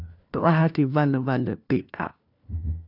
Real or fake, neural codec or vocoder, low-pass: fake; codec, 16 kHz, 1 kbps, FunCodec, trained on LibriTTS, 50 frames a second; 5.4 kHz